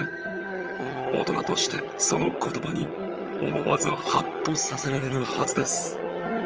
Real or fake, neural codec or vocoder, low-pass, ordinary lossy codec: fake; vocoder, 22.05 kHz, 80 mel bands, HiFi-GAN; 7.2 kHz; Opus, 24 kbps